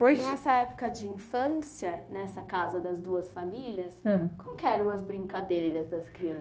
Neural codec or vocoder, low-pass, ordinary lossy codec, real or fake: codec, 16 kHz, 0.9 kbps, LongCat-Audio-Codec; none; none; fake